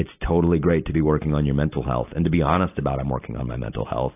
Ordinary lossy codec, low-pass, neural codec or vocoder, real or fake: AAC, 24 kbps; 3.6 kHz; none; real